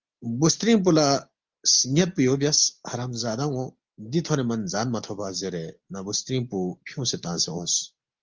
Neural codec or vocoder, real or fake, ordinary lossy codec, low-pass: none; real; Opus, 32 kbps; 7.2 kHz